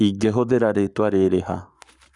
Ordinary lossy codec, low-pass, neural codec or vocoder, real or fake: none; 10.8 kHz; codec, 44.1 kHz, 7.8 kbps, Pupu-Codec; fake